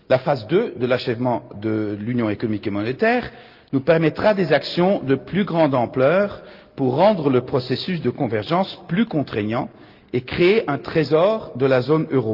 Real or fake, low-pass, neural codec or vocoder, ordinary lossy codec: real; 5.4 kHz; none; Opus, 32 kbps